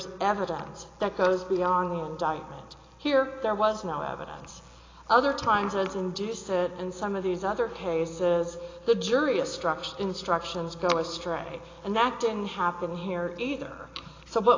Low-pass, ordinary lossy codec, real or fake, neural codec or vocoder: 7.2 kHz; AAC, 32 kbps; real; none